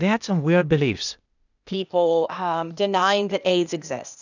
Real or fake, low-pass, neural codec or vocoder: fake; 7.2 kHz; codec, 16 kHz, 0.8 kbps, ZipCodec